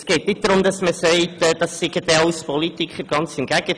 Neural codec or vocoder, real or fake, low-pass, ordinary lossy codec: none; real; 9.9 kHz; none